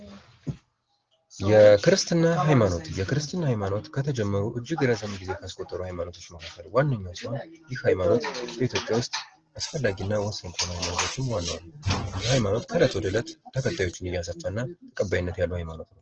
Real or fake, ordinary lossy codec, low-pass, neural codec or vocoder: real; Opus, 16 kbps; 7.2 kHz; none